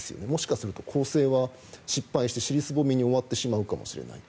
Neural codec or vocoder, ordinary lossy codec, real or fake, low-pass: none; none; real; none